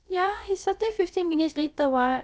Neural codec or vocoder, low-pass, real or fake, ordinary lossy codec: codec, 16 kHz, about 1 kbps, DyCAST, with the encoder's durations; none; fake; none